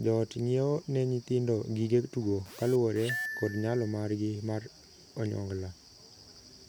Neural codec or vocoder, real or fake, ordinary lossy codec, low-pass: none; real; none; none